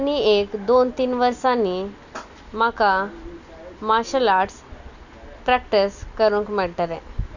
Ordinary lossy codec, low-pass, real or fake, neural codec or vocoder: none; 7.2 kHz; real; none